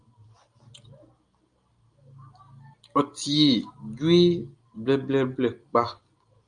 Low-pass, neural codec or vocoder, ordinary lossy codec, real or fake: 9.9 kHz; none; Opus, 32 kbps; real